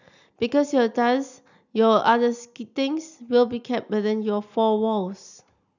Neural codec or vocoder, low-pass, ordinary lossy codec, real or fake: none; 7.2 kHz; none; real